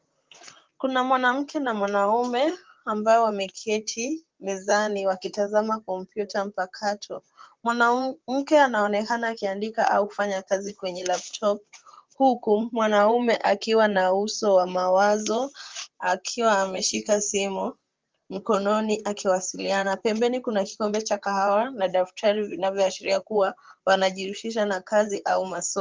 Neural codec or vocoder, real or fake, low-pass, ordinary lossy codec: vocoder, 44.1 kHz, 128 mel bands, Pupu-Vocoder; fake; 7.2 kHz; Opus, 32 kbps